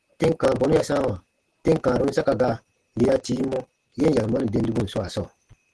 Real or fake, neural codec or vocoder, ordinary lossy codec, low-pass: real; none; Opus, 16 kbps; 10.8 kHz